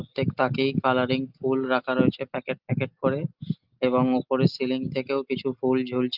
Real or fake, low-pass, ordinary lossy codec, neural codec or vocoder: real; 5.4 kHz; Opus, 32 kbps; none